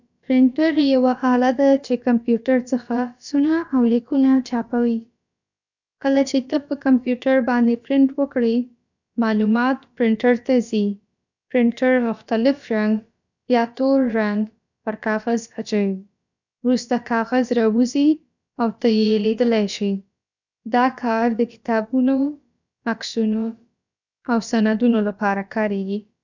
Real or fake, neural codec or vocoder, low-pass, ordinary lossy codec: fake; codec, 16 kHz, about 1 kbps, DyCAST, with the encoder's durations; 7.2 kHz; none